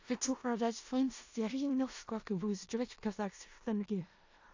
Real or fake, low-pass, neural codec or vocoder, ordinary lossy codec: fake; 7.2 kHz; codec, 16 kHz in and 24 kHz out, 0.4 kbps, LongCat-Audio-Codec, four codebook decoder; AAC, 48 kbps